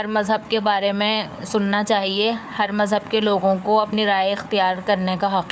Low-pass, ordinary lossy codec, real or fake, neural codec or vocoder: none; none; fake; codec, 16 kHz, 4 kbps, FunCodec, trained on Chinese and English, 50 frames a second